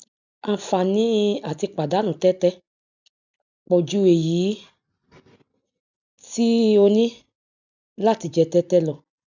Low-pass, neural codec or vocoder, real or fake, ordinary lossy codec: 7.2 kHz; none; real; none